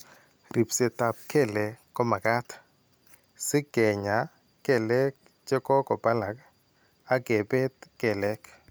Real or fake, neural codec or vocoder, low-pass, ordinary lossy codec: real; none; none; none